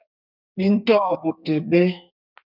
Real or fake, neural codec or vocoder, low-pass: fake; codec, 32 kHz, 1.9 kbps, SNAC; 5.4 kHz